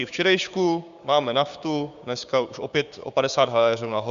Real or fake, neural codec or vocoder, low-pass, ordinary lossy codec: fake; codec, 16 kHz, 6 kbps, DAC; 7.2 kHz; Opus, 64 kbps